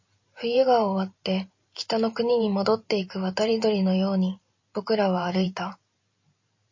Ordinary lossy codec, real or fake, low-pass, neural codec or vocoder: MP3, 32 kbps; fake; 7.2 kHz; vocoder, 44.1 kHz, 128 mel bands every 512 samples, BigVGAN v2